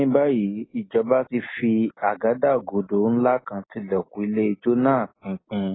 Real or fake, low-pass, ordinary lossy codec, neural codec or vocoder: real; 7.2 kHz; AAC, 16 kbps; none